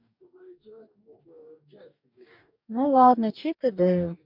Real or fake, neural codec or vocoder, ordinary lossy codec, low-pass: fake; codec, 44.1 kHz, 2.6 kbps, DAC; MP3, 48 kbps; 5.4 kHz